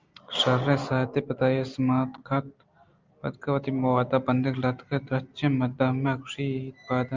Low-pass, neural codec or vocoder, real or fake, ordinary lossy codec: 7.2 kHz; none; real; Opus, 32 kbps